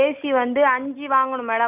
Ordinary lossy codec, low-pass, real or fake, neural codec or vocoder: none; 3.6 kHz; real; none